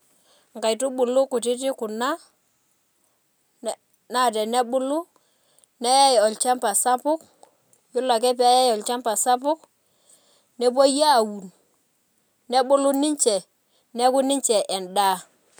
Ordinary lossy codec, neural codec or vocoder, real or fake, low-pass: none; none; real; none